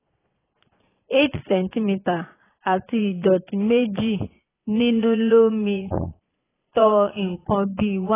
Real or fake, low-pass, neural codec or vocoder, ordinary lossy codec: fake; 3.6 kHz; vocoder, 22.05 kHz, 80 mel bands, Vocos; AAC, 16 kbps